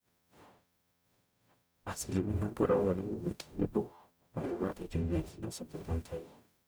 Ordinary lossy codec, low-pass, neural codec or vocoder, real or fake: none; none; codec, 44.1 kHz, 0.9 kbps, DAC; fake